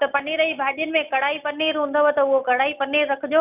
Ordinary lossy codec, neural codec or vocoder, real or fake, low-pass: none; none; real; 3.6 kHz